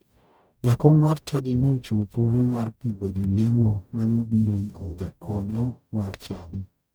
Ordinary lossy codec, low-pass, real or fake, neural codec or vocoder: none; none; fake; codec, 44.1 kHz, 0.9 kbps, DAC